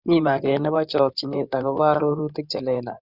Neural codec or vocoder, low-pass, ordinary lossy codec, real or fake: codec, 16 kHz in and 24 kHz out, 2.2 kbps, FireRedTTS-2 codec; 5.4 kHz; Opus, 64 kbps; fake